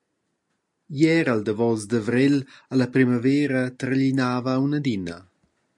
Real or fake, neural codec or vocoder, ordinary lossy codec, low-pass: real; none; MP3, 64 kbps; 10.8 kHz